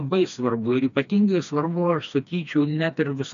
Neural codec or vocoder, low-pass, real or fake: codec, 16 kHz, 2 kbps, FreqCodec, smaller model; 7.2 kHz; fake